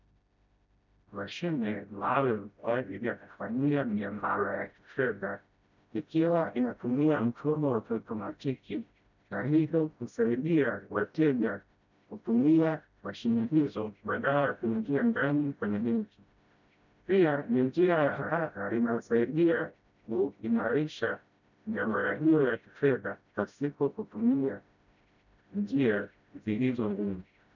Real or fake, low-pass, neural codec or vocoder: fake; 7.2 kHz; codec, 16 kHz, 0.5 kbps, FreqCodec, smaller model